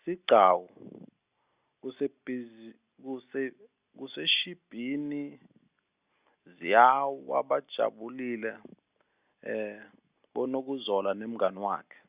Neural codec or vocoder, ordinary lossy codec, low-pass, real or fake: none; Opus, 64 kbps; 3.6 kHz; real